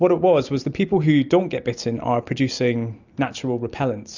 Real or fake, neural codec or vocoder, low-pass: real; none; 7.2 kHz